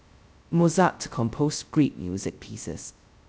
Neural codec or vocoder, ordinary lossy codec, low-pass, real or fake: codec, 16 kHz, 0.2 kbps, FocalCodec; none; none; fake